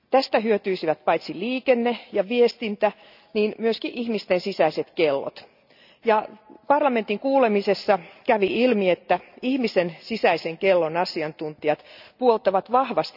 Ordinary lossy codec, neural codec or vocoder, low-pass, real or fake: none; none; 5.4 kHz; real